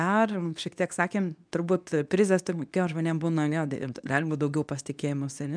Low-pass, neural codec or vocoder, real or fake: 9.9 kHz; codec, 24 kHz, 0.9 kbps, WavTokenizer, medium speech release version 2; fake